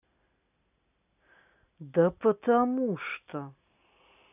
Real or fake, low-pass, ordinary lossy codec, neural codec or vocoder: real; 3.6 kHz; none; none